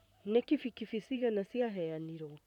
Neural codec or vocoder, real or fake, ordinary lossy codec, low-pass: vocoder, 44.1 kHz, 128 mel bands, Pupu-Vocoder; fake; none; 19.8 kHz